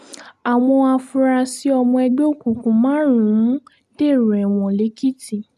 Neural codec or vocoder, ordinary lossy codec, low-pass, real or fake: none; MP3, 96 kbps; 14.4 kHz; real